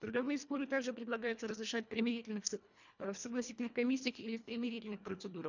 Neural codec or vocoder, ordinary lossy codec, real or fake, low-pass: codec, 24 kHz, 1.5 kbps, HILCodec; none; fake; 7.2 kHz